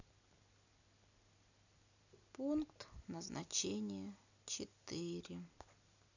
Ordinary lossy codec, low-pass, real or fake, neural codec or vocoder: none; 7.2 kHz; real; none